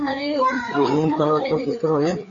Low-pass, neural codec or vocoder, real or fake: 7.2 kHz; codec, 16 kHz, 4 kbps, FreqCodec, larger model; fake